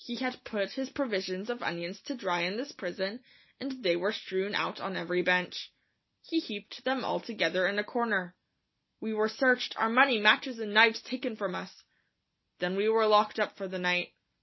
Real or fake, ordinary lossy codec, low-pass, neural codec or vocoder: real; MP3, 24 kbps; 7.2 kHz; none